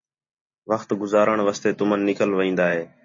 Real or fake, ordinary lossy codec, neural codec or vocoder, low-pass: real; MP3, 32 kbps; none; 7.2 kHz